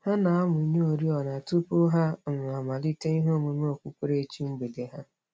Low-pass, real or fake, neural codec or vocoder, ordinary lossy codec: none; real; none; none